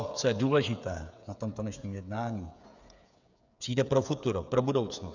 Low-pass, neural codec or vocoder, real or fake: 7.2 kHz; codec, 16 kHz, 8 kbps, FreqCodec, smaller model; fake